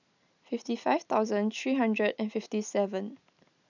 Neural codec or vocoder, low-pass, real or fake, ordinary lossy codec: none; 7.2 kHz; real; none